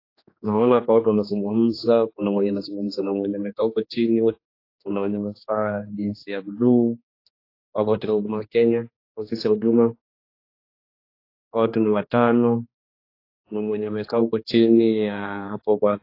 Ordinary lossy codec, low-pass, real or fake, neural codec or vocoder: AAC, 32 kbps; 5.4 kHz; fake; codec, 16 kHz, 2 kbps, X-Codec, HuBERT features, trained on general audio